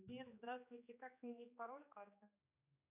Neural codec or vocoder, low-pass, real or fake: codec, 16 kHz, 2 kbps, X-Codec, HuBERT features, trained on general audio; 3.6 kHz; fake